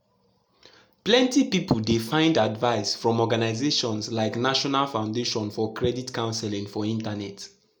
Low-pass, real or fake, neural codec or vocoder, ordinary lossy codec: none; real; none; none